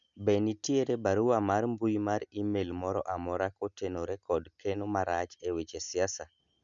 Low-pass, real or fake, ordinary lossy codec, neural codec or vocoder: 7.2 kHz; real; none; none